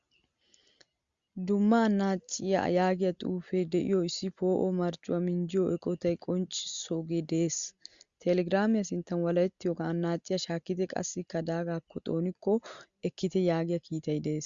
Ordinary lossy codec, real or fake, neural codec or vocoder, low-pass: Opus, 64 kbps; real; none; 7.2 kHz